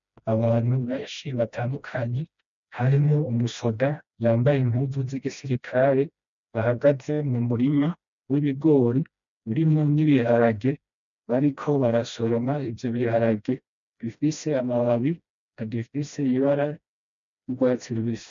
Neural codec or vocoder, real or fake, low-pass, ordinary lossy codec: codec, 16 kHz, 1 kbps, FreqCodec, smaller model; fake; 7.2 kHz; MP3, 64 kbps